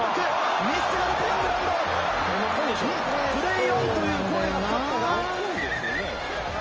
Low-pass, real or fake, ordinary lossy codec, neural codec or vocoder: 7.2 kHz; real; Opus, 24 kbps; none